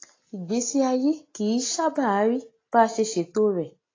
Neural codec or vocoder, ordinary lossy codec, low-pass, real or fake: none; AAC, 32 kbps; 7.2 kHz; real